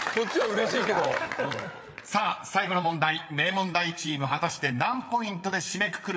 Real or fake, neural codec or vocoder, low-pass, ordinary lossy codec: fake; codec, 16 kHz, 8 kbps, FreqCodec, larger model; none; none